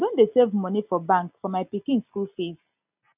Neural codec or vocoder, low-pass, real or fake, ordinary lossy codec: none; 3.6 kHz; real; none